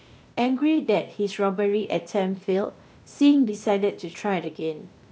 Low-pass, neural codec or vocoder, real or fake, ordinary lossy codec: none; codec, 16 kHz, 0.8 kbps, ZipCodec; fake; none